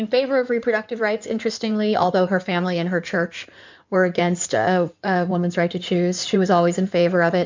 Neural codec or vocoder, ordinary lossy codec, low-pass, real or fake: codec, 16 kHz in and 24 kHz out, 2.2 kbps, FireRedTTS-2 codec; MP3, 64 kbps; 7.2 kHz; fake